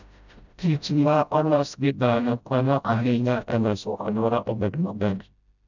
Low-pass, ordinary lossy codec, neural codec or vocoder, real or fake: 7.2 kHz; none; codec, 16 kHz, 0.5 kbps, FreqCodec, smaller model; fake